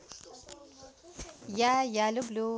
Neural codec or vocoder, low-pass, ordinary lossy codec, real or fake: none; none; none; real